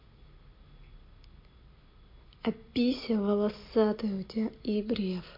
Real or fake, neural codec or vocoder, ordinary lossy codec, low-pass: real; none; MP3, 32 kbps; 5.4 kHz